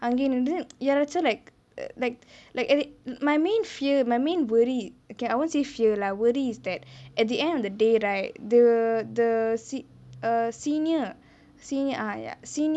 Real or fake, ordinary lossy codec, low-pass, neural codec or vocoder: real; none; none; none